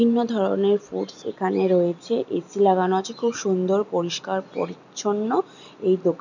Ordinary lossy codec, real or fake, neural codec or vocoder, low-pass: none; real; none; 7.2 kHz